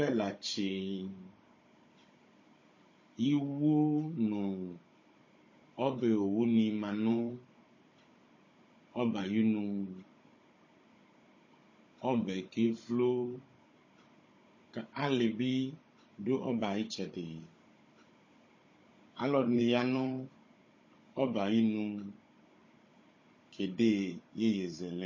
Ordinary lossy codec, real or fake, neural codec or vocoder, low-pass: MP3, 32 kbps; fake; codec, 16 kHz, 16 kbps, FunCodec, trained on Chinese and English, 50 frames a second; 7.2 kHz